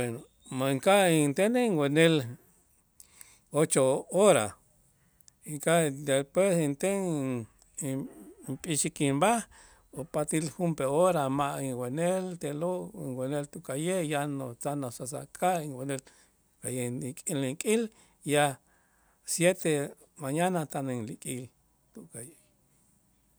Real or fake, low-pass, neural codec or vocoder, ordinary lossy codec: real; none; none; none